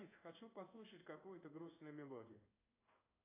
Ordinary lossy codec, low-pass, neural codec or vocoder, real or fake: AAC, 16 kbps; 3.6 kHz; codec, 16 kHz in and 24 kHz out, 1 kbps, XY-Tokenizer; fake